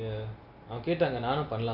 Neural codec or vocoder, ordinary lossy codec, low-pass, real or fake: none; none; 5.4 kHz; real